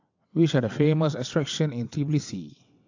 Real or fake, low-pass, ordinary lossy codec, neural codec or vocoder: fake; 7.2 kHz; AAC, 48 kbps; vocoder, 22.05 kHz, 80 mel bands, WaveNeXt